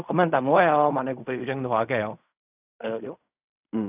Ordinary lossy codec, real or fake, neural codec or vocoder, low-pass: none; fake; codec, 16 kHz in and 24 kHz out, 0.4 kbps, LongCat-Audio-Codec, fine tuned four codebook decoder; 3.6 kHz